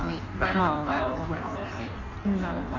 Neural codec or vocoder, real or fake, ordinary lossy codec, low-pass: codec, 16 kHz in and 24 kHz out, 1.1 kbps, FireRedTTS-2 codec; fake; none; 7.2 kHz